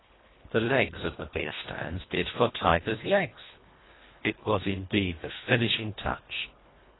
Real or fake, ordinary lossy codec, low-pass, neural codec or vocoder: fake; AAC, 16 kbps; 7.2 kHz; codec, 24 kHz, 1.5 kbps, HILCodec